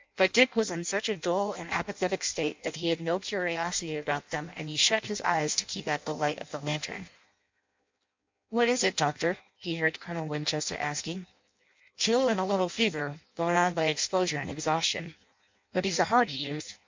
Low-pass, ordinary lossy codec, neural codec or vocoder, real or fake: 7.2 kHz; MP3, 64 kbps; codec, 16 kHz in and 24 kHz out, 0.6 kbps, FireRedTTS-2 codec; fake